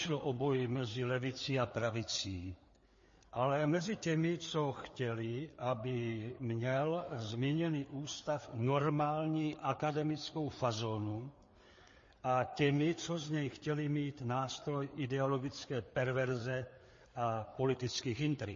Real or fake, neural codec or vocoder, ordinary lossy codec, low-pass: fake; codec, 16 kHz, 4 kbps, FreqCodec, larger model; MP3, 32 kbps; 7.2 kHz